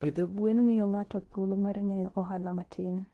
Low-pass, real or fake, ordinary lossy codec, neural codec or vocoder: 10.8 kHz; fake; Opus, 16 kbps; codec, 16 kHz in and 24 kHz out, 0.8 kbps, FocalCodec, streaming, 65536 codes